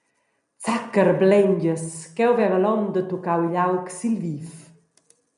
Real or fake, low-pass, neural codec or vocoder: real; 10.8 kHz; none